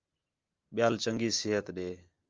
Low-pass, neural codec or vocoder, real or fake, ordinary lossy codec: 7.2 kHz; none; real; Opus, 24 kbps